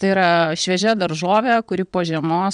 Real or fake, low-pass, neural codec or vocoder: fake; 9.9 kHz; vocoder, 22.05 kHz, 80 mel bands, WaveNeXt